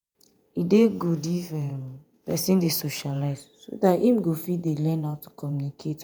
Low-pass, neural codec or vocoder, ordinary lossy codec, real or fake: none; vocoder, 48 kHz, 128 mel bands, Vocos; none; fake